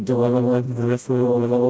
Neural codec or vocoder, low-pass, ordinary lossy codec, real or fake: codec, 16 kHz, 0.5 kbps, FreqCodec, smaller model; none; none; fake